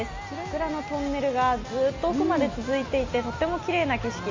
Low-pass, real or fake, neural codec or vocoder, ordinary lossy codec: 7.2 kHz; real; none; MP3, 48 kbps